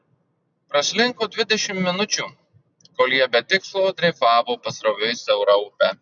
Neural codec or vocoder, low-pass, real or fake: none; 7.2 kHz; real